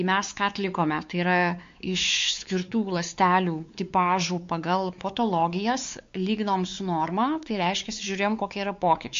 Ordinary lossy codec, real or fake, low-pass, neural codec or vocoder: MP3, 48 kbps; fake; 7.2 kHz; codec, 16 kHz, 4 kbps, X-Codec, WavLM features, trained on Multilingual LibriSpeech